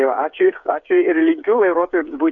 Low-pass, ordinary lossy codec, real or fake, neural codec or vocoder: 7.2 kHz; AAC, 64 kbps; fake; codec, 16 kHz, 8 kbps, FreqCodec, smaller model